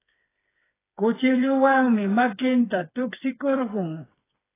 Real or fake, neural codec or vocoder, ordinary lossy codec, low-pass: fake; codec, 16 kHz, 4 kbps, FreqCodec, smaller model; AAC, 16 kbps; 3.6 kHz